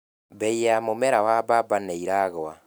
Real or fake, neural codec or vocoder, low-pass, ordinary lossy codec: real; none; none; none